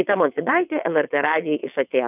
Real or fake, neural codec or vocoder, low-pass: fake; vocoder, 22.05 kHz, 80 mel bands, WaveNeXt; 3.6 kHz